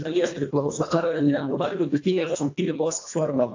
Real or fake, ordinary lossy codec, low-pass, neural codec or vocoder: fake; AAC, 48 kbps; 7.2 kHz; codec, 24 kHz, 1.5 kbps, HILCodec